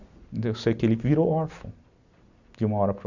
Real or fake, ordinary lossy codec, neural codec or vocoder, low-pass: real; none; none; 7.2 kHz